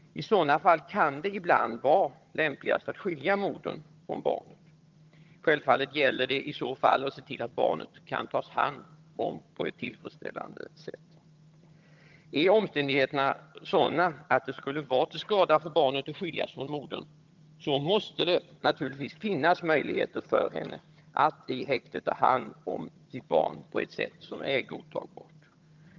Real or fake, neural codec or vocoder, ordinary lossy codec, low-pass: fake; vocoder, 22.05 kHz, 80 mel bands, HiFi-GAN; Opus, 32 kbps; 7.2 kHz